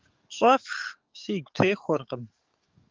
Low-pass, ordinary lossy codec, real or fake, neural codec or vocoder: 7.2 kHz; Opus, 32 kbps; fake; codec, 44.1 kHz, 7.8 kbps, DAC